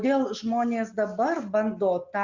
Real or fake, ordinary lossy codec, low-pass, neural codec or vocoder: real; Opus, 64 kbps; 7.2 kHz; none